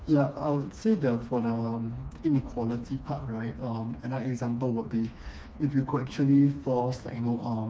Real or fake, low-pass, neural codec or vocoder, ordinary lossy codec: fake; none; codec, 16 kHz, 2 kbps, FreqCodec, smaller model; none